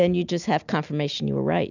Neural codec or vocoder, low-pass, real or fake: none; 7.2 kHz; real